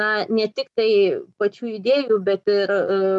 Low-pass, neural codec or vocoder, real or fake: 10.8 kHz; none; real